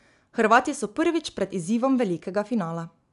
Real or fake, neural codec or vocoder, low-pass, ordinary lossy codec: real; none; 10.8 kHz; none